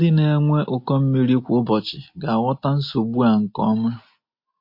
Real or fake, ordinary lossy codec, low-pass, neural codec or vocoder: real; MP3, 32 kbps; 5.4 kHz; none